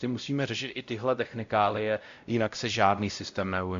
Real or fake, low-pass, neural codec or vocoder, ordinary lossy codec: fake; 7.2 kHz; codec, 16 kHz, 0.5 kbps, X-Codec, WavLM features, trained on Multilingual LibriSpeech; AAC, 64 kbps